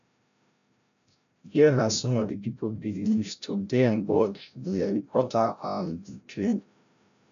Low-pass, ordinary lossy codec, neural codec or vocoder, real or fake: 7.2 kHz; none; codec, 16 kHz, 0.5 kbps, FreqCodec, larger model; fake